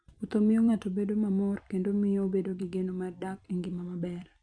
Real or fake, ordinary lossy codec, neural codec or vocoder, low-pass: fake; none; vocoder, 44.1 kHz, 128 mel bands every 256 samples, BigVGAN v2; 9.9 kHz